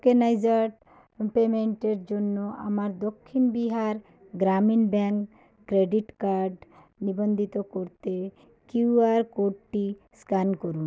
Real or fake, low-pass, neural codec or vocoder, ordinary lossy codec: real; none; none; none